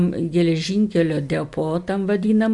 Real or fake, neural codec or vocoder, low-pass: real; none; 10.8 kHz